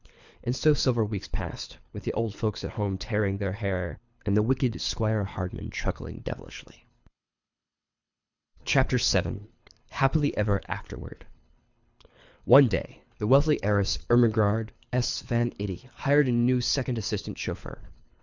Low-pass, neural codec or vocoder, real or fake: 7.2 kHz; codec, 24 kHz, 6 kbps, HILCodec; fake